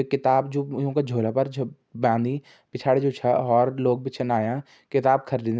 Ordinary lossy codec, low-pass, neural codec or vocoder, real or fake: none; none; none; real